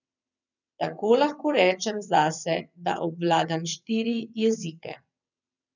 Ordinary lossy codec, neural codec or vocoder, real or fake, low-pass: none; vocoder, 22.05 kHz, 80 mel bands, WaveNeXt; fake; 7.2 kHz